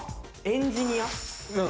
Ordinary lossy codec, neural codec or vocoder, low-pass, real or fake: none; none; none; real